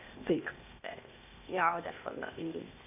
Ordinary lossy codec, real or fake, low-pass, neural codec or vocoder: none; fake; 3.6 kHz; codec, 16 kHz, 0.8 kbps, ZipCodec